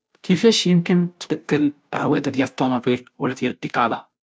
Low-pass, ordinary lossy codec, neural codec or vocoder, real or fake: none; none; codec, 16 kHz, 0.5 kbps, FunCodec, trained on Chinese and English, 25 frames a second; fake